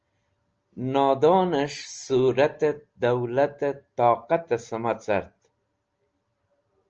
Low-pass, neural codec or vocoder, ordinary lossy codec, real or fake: 7.2 kHz; none; Opus, 24 kbps; real